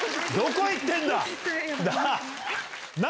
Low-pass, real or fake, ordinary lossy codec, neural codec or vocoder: none; real; none; none